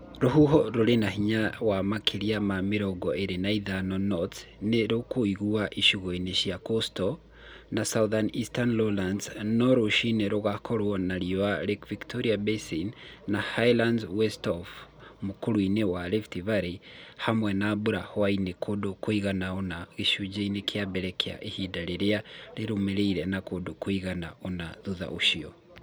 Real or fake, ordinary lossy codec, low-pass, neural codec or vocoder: real; none; none; none